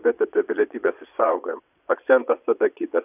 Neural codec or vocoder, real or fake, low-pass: vocoder, 22.05 kHz, 80 mel bands, Vocos; fake; 3.6 kHz